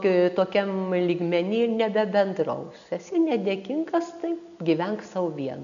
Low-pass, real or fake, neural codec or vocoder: 7.2 kHz; real; none